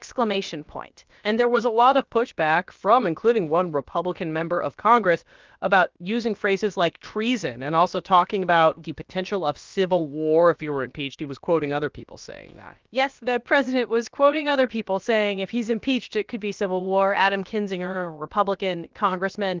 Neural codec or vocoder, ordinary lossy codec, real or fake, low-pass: codec, 16 kHz, about 1 kbps, DyCAST, with the encoder's durations; Opus, 24 kbps; fake; 7.2 kHz